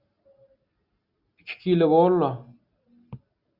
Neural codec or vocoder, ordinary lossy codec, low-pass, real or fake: none; Opus, 64 kbps; 5.4 kHz; real